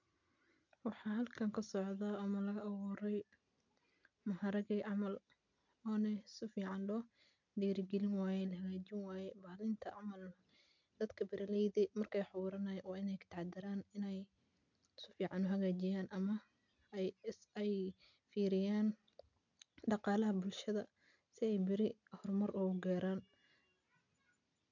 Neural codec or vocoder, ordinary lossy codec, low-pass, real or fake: none; none; 7.2 kHz; real